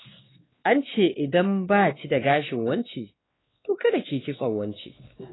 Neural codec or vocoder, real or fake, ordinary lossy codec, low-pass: codec, 16 kHz, 2 kbps, X-Codec, WavLM features, trained on Multilingual LibriSpeech; fake; AAC, 16 kbps; 7.2 kHz